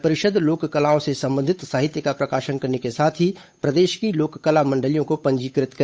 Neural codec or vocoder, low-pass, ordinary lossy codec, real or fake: codec, 16 kHz, 8 kbps, FunCodec, trained on Chinese and English, 25 frames a second; none; none; fake